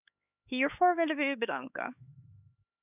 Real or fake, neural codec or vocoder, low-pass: fake; codec, 16 kHz, 4 kbps, X-Codec, HuBERT features, trained on LibriSpeech; 3.6 kHz